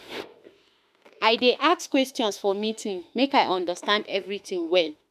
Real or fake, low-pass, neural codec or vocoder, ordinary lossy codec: fake; 14.4 kHz; autoencoder, 48 kHz, 32 numbers a frame, DAC-VAE, trained on Japanese speech; none